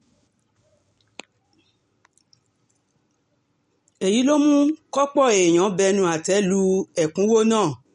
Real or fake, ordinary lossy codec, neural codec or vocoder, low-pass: real; MP3, 48 kbps; none; 10.8 kHz